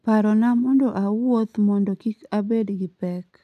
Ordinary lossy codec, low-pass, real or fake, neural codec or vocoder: none; 14.4 kHz; real; none